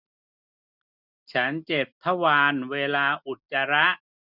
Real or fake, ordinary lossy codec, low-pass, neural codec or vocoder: real; none; 5.4 kHz; none